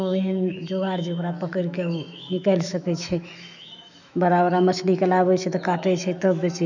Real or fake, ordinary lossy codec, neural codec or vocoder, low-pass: fake; none; autoencoder, 48 kHz, 128 numbers a frame, DAC-VAE, trained on Japanese speech; 7.2 kHz